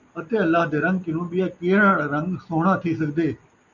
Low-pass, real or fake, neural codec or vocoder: 7.2 kHz; real; none